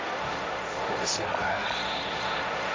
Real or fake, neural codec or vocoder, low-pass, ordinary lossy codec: fake; codec, 16 kHz, 1.1 kbps, Voila-Tokenizer; none; none